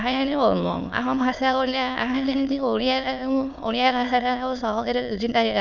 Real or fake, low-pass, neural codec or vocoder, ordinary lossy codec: fake; 7.2 kHz; autoencoder, 22.05 kHz, a latent of 192 numbers a frame, VITS, trained on many speakers; none